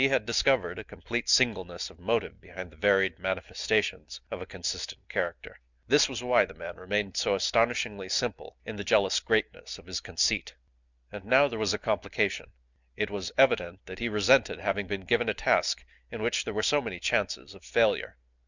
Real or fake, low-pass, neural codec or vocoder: real; 7.2 kHz; none